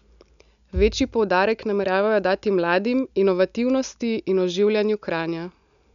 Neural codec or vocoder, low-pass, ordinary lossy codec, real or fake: none; 7.2 kHz; none; real